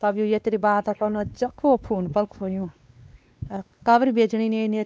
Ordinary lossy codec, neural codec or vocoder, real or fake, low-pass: none; codec, 16 kHz, 2 kbps, X-Codec, WavLM features, trained on Multilingual LibriSpeech; fake; none